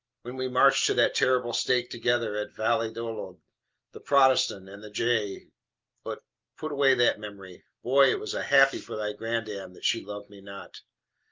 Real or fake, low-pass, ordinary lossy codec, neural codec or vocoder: real; 7.2 kHz; Opus, 24 kbps; none